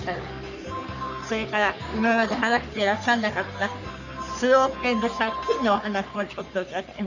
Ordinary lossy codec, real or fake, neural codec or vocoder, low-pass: none; fake; codec, 44.1 kHz, 3.4 kbps, Pupu-Codec; 7.2 kHz